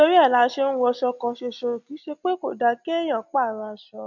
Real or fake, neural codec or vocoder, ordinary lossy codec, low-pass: real; none; none; 7.2 kHz